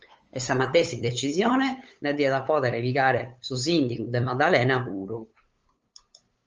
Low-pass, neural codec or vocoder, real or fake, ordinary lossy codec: 7.2 kHz; codec, 16 kHz, 8 kbps, FunCodec, trained on LibriTTS, 25 frames a second; fake; Opus, 24 kbps